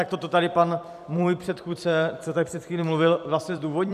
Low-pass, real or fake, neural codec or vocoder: 14.4 kHz; fake; vocoder, 44.1 kHz, 128 mel bands every 256 samples, BigVGAN v2